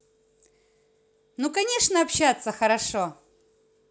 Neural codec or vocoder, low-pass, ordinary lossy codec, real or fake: none; none; none; real